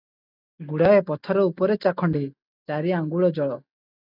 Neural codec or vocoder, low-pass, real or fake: none; 5.4 kHz; real